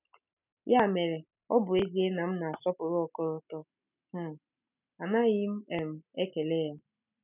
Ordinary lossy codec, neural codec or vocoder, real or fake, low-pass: none; none; real; 3.6 kHz